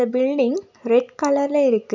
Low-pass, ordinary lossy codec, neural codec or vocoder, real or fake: 7.2 kHz; none; none; real